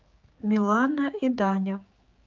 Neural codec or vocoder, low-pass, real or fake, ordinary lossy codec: codec, 16 kHz, 4 kbps, X-Codec, HuBERT features, trained on general audio; 7.2 kHz; fake; Opus, 24 kbps